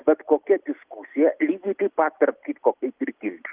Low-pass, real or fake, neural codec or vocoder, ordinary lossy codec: 3.6 kHz; real; none; Opus, 32 kbps